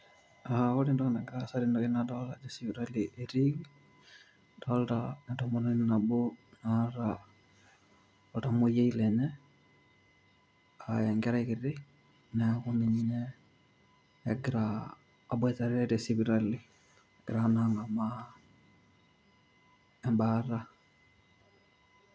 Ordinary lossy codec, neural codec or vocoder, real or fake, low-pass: none; none; real; none